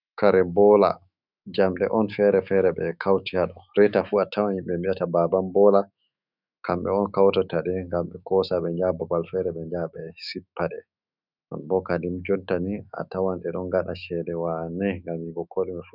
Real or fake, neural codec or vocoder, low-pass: fake; autoencoder, 48 kHz, 128 numbers a frame, DAC-VAE, trained on Japanese speech; 5.4 kHz